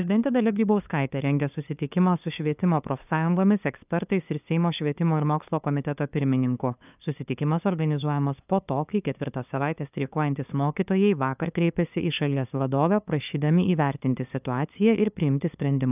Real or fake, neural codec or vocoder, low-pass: fake; codec, 16 kHz, 2 kbps, FunCodec, trained on LibriTTS, 25 frames a second; 3.6 kHz